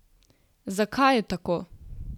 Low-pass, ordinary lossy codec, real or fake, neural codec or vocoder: 19.8 kHz; none; real; none